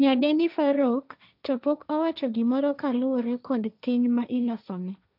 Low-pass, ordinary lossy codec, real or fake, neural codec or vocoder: 5.4 kHz; none; fake; codec, 16 kHz, 1.1 kbps, Voila-Tokenizer